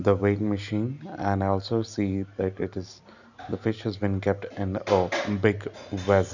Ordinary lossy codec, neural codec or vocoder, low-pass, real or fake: none; none; 7.2 kHz; real